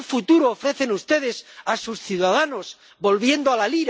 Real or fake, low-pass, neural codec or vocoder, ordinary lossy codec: real; none; none; none